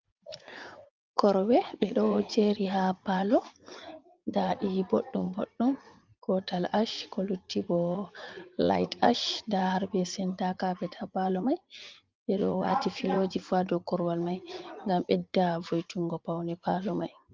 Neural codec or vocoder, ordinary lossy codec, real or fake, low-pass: vocoder, 44.1 kHz, 80 mel bands, Vocos; Opus, 32 kbps; fake; 7.2 kHz